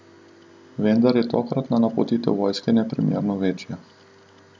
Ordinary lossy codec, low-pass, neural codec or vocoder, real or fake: none; none; none; real